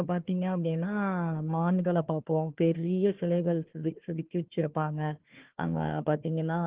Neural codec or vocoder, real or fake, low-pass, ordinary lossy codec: codec, 16 kHz, 1 kbps, FunCodec, trained on Chinese and English, 50 frames a second; fake; 3.6 kHz; Opus, 16 kbps